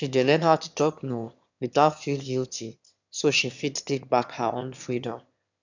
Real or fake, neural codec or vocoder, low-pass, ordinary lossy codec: fake; autoencoder, 22.05 kHz, a latent of 192 numbers a frame, VITS, trained on one speaker; 7.2 kHz; none